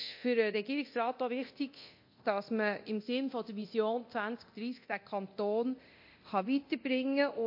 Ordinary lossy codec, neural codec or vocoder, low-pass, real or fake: MP3, 32 kbps; codec, 24 kHz, 0.9 kbps, DualCodec; 5.4 kHz; fake